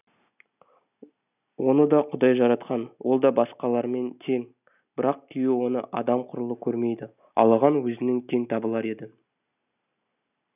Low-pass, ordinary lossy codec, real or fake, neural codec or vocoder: 3.6 kHz; none; real; none